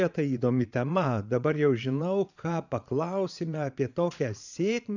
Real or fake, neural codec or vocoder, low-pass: fake; vocoder, 22.05 kHz, 80 mel bands, Vocos; 7.2 kHz